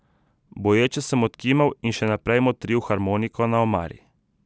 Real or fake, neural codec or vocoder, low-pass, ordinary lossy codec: real; none; none; none